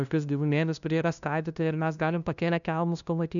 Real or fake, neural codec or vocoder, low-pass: fake; codec, 16 kHz, 0.5 kbps, FunCodec, trained on LibriTTS, 25 frames a second; 7.2 kHz